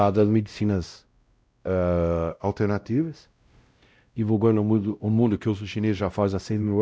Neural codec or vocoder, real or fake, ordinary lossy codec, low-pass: codec, 16 kHz, 0.5 kbps, X-Codec, WavLM features, trained on Multilingual LibriSpeech; fake; none; none